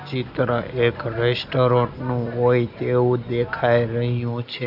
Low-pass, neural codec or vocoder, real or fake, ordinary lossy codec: 5.4 kHz; none; real; none